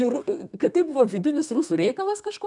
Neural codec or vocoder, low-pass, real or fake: codec, 32 kHz, 1.9 kbps, SNAC; 10.8 kHz; fake